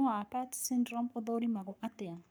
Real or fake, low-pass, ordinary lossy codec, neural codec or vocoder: fake; none; none; codec, 44.1 kHz, 7.8 kbps, Pupu-Codec